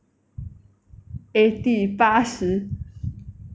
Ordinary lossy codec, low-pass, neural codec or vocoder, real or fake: none; none; none; real